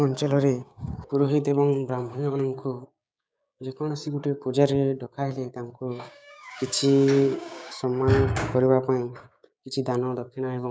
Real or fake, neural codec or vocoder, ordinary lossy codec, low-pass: fake; codec, 16 kHz, 6 kbps, DAC; none; none